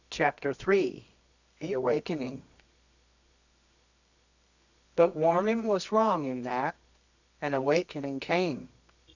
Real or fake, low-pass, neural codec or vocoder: fake; 7.2 kHz; codec, 24 kHz, 0.9 kbps, WavTokenizer, medium music audio release